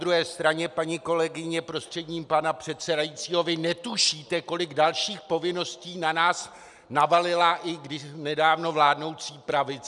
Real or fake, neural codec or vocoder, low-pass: real; none; 10.8 kHz